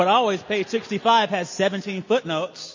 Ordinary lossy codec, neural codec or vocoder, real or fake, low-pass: MP3, 32 kbps; none; real; 7.2 kHz